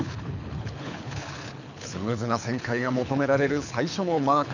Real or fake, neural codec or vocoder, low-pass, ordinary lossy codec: fake; codec, 24 kHz, 6 kbps, HILCodec; 7.2 kHz; none